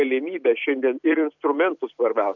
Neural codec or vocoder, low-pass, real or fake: vocoder, 44.1 kHz, 128 mel bands every 256 samples, BigVGAN v2; 7.2 kHz; fake